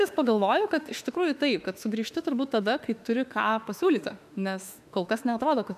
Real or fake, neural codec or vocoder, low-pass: fake; autoencoder, 48 kHz, 32 numbers a frame, DAC-VAE, trained on Japanese speech; 14.4 kHz